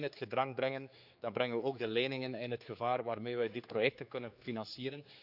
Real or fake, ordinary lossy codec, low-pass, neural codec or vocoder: fake; none; 5.4 kHz; codec, 16 kHz, 4 kbps, X-Codec, HuBERT features, trained on general audio